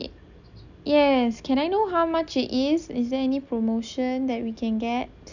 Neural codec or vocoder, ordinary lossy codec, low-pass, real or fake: none; none; 7.2 kHz; real